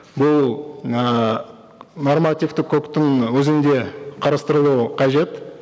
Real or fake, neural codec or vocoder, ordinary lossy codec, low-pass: real; none; none; none